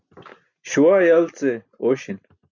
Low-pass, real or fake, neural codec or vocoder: 7.2 kHz; real; none